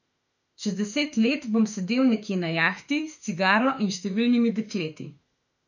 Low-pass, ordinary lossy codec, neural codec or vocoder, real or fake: 7.2 kHz; none; autoencoder, 48 kHz, 32 numbers a frame, DAC-VAE, trained on Japanese speech; fake